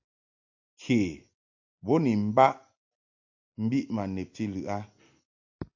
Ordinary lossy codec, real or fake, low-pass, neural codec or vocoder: AAC, 48 kbps; real; 7.2 kHz; none